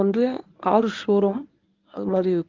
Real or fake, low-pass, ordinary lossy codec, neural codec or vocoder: fake; 7.2 kHz; Opus, 32 kbps; codec, 24 kHz, 0.9 kbps, WavTokenizer, medium speech release version 1